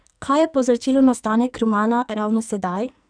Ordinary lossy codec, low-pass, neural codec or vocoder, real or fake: none; 9.9 kHz; codec, 44.1 kHz, 2.6 kbps, SNAC; fake